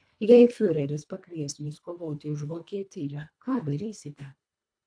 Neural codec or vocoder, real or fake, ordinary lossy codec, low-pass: codec, 24 kHz, 1.5 kbps, HILCodec; fake; AAC, 64 kbps; 9.9 kHz